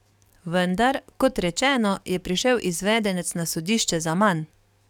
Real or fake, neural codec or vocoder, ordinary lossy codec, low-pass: fake; codec, 44.1 kHz, 7.8 kbps, DAC; none; 19.8 kHz